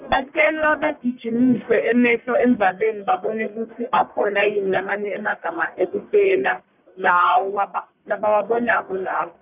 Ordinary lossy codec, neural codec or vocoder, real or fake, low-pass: none; codec, 44.1 kHz, 1.7 kbps, Pupu-Codec; fake; 3.6 kHz